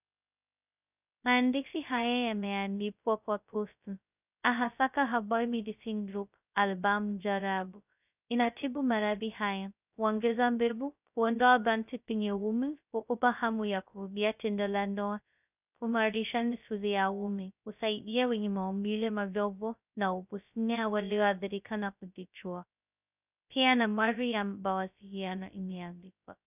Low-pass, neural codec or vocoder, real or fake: 3.6 kHz; codec, 16 kHz, 0.2 kbps, FocalCodec; fake